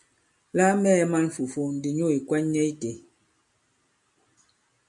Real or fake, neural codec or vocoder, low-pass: real; none; 10.8 kHz